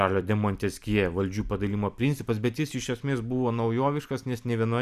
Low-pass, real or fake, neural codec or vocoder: 14.4 kHz; fake; vocoder, 44.1 kHz, 128 mel bands every 256 samples, BigVGAN v2